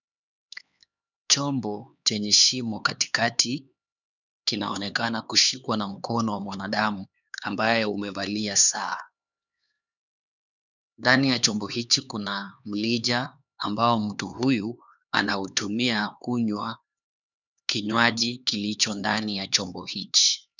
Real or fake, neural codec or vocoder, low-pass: fake; codec, 16 kHz, 4 kbps, X-Codec, HuBERT features, trained on LibriSpeech; 7.2 kHz